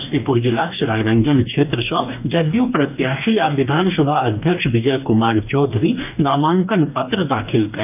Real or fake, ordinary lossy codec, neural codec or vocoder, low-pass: fake; none; codec, 44.1 kHz, 2.6 kbps, DAC; 3.6 kHz